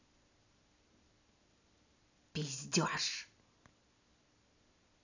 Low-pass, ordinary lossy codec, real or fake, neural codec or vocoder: 7.2 kHz; none; real; none